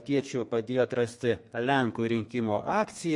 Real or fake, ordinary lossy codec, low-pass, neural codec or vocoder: fake; MP3, 48 kbps; 10.8 kHz; codec, 32 kHz, 1.9 kbps, SNAC